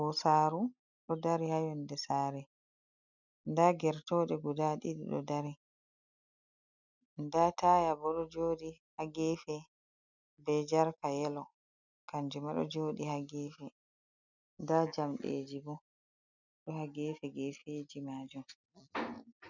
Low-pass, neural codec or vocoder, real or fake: 7.2 kHz; none; real